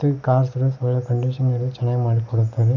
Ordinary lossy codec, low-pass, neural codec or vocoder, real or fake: none; 7.2 kHz; none; real